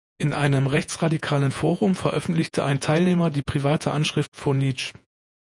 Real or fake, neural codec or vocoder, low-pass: fake; vocoder, 48 kHz, 128 mel bands, Vocos; 10.8 kHz